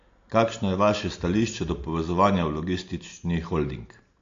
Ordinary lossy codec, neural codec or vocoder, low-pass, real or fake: AAC, 48 kbps; none; 7.2 kHz; real